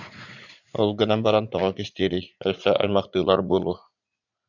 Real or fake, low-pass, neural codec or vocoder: fake; 7.2 kHz; vocoder, 44.1 kHz, 80 mel bands, Vocos